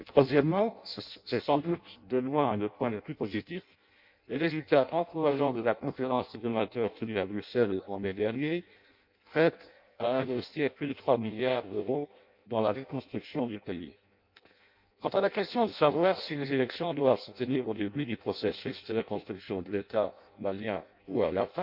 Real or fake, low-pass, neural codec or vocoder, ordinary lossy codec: fake; 5.4 kHz; codec, 16 kHz in and 24 kHz out, 0.6 kbps, FireRedTTS-2 codec; MP3, 48 kbps